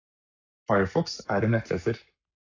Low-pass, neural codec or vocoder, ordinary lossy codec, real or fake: 7.2 kHz; codec, 44.1 kHz, 7.8 kbps, Pupu-Codec; AAC, 48 kbps; fake